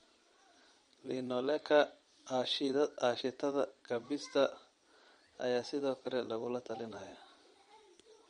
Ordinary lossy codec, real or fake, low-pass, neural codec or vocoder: MP3, 48 kbps; fake; 9.9 kHz; vocoder, 22.05 kHz, 80 mel bands, Vocos